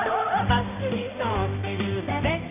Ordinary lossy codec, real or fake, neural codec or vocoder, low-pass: AAC, 32 kbps; fake; codec, 16 kHz, 1 kbps, X-Codec, HuBERT features, trained on balanced general audio; 3.6 kHz